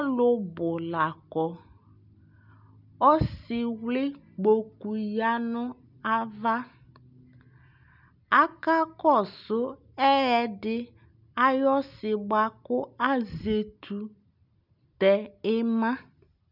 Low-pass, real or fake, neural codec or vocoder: 5.4 kHz; real; none